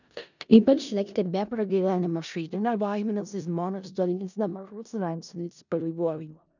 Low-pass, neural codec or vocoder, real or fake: 7.2 kHz; codec, 16 kHz in and 24 kHz out, 0.4 kbps, LongCat-Audio-Codec, four codebook decoder; fake